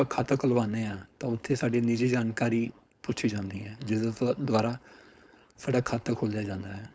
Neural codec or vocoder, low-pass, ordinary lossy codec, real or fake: codec, 16 kHz, 4.8 kbps, FACodec; none; none; fake